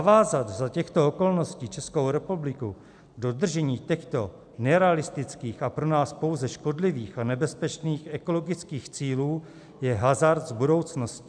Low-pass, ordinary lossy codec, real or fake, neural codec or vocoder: 9.9 kHz; MP3, 96 kbps; real; none